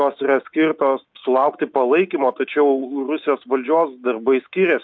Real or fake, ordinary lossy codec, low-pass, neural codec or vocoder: real; MP3, 48 kbps; 7.2 kHz; none